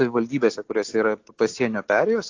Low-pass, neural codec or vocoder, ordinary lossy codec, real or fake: 7.2 kHz; none; AAC, 48 kbps; real